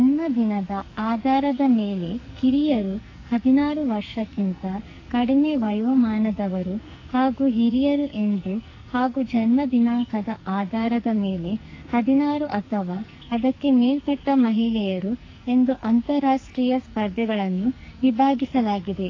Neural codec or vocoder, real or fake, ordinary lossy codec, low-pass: codec, 44.1 kHz, 2.6 kbps, SNAC; fake; AAC, 32 kbps; 7.2 kHz